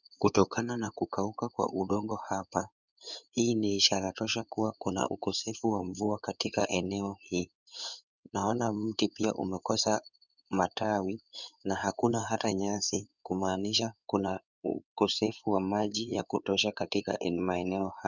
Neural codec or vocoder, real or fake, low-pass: codec, 16 kHz in and 24 kHz out, 2.2 kbps, FireRedTTS-2 codec; fake; 7.2 kHz